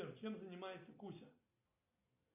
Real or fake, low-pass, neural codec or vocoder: real; 3.6 kHz; none